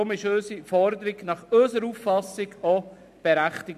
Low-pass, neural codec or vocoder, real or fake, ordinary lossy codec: 14.4 kHz; none; real; none